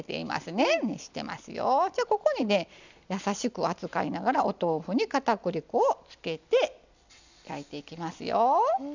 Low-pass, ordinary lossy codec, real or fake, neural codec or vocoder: 7.2 kHz; none; real; none